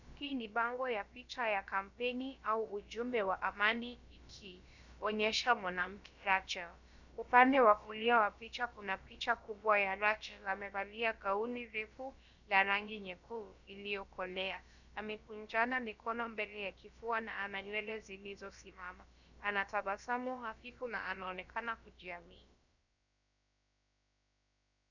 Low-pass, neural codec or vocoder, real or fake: 7.2 kHz; codec, 16 kHz, about 1 kbps, DyCAST, with the encoder's durations; fake